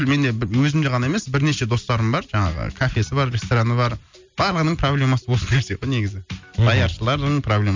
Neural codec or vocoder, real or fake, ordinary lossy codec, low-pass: none; real; MP3, 64 kbps; 7.2 kHz